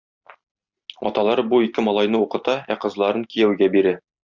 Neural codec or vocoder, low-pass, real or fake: none; 7.2 kHz; real